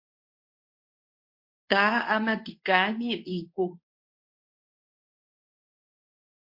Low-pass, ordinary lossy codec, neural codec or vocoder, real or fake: 5.4 kHz; MP3, 32 kbps; codec, 24 kHz, 0.9 kbps, WavTokenizer, medium speech release version 2; fake